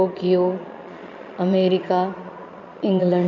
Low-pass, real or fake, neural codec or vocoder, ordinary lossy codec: 7.2 kHz; fake; vocoder, 22.05 kHz, 80 mel bands, Vocos; none